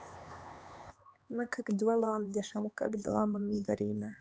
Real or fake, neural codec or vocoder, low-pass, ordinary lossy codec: fake; codec, 16 kHz, 4 kbps, X-Codec, HuBERT features, trained on LibriSpeech; none; none